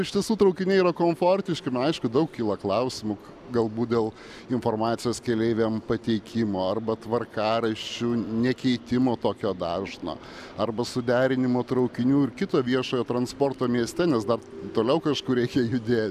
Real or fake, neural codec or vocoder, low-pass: real; none; 14.4 kHz